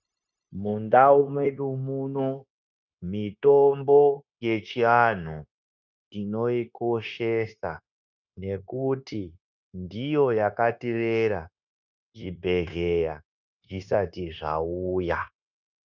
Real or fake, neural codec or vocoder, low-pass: fake; codec, 16 kHz, 0.9 kbps, LongCat-Audio-Codec; 7.2 kHz